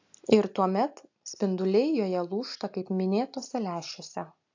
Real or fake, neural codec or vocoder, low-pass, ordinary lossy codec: real; none; 7.2 kHz; AAC, 48 kbps